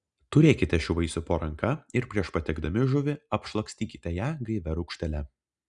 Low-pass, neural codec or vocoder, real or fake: 10.8 kHz; none; real